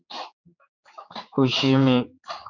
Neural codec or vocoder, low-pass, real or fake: codec, 16 kHz, 4 kbps, X-Codec, HuBERT features, trained on general audio; 7.2 kHz; fake